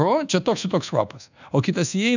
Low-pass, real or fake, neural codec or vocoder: 7.2 kHz; fake; codec, 24 kHz, 1.2 kbps, DualCodec